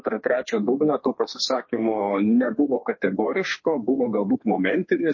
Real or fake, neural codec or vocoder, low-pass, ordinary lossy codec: fake; codec, 32 kHz, 1.9 kbps, SNAC; 7.2 kHz; MP3, 32 kbps